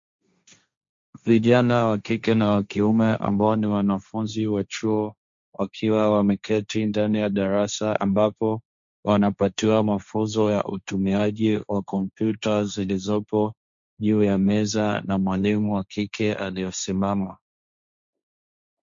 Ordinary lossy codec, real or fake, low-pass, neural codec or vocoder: MP3, 48 kbps; fake; 7.2 kHz; codec, 16 kHz, 1.1 kbps, Voila-Tokenizer